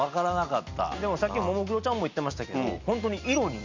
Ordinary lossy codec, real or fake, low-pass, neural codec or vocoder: MP3, 64 kbps; real; 7.2 kHz; none